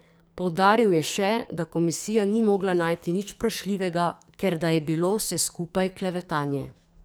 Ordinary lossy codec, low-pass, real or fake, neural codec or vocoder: none; none; fake; codec, 44.1 kHz, 2.6 kbps, SNAC